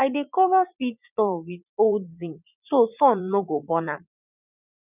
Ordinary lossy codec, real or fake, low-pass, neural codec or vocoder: none; fake; 3.6 kHz; vocoder, 44.1 kHz, 80 mel bands, Vocos